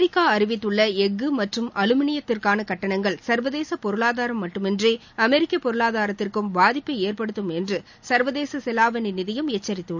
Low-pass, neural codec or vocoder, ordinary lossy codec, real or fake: 7.2 kHz; none; none; real